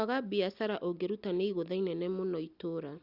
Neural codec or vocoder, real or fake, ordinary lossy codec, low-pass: none; real; Opus, 64 kbps; 5.4 kHz